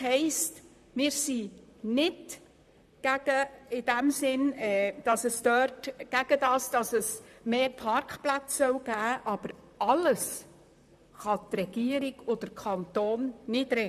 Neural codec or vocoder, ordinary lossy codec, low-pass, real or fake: vocoder, 44.1 kHz, 128 mel bands, Pupu-Vocoder; none; 14.4 kHz; fake